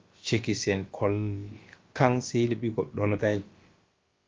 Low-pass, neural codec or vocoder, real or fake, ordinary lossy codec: 7.2 kHz; codec, 16 kHz, about 1 kbps, DyCAST, with the encoder's durations; fake; Opus, 32 kbps